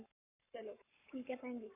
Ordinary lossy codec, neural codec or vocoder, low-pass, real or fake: none; codec, 44.1 kHz, 7.8 kbps, Pupu-Codec; 3.6 kHz; fake